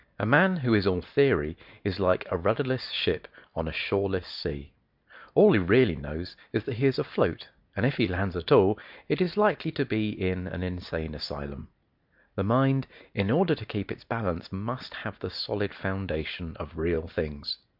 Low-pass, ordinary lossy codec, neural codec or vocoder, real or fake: 5.4 kHz; MP3, 48 kbps; none; real